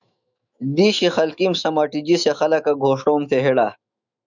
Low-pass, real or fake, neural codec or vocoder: 7.2 kHz; fake; autoencoder, 48 kHz, 128 numbers a frame, DAC-VAE, trained on Japanese speech